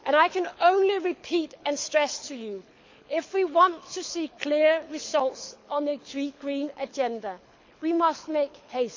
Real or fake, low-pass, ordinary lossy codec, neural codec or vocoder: fake; 7.2 kHz; MP3, 64 kbps; codec, 24 kHz, 6 kbps, HILCodec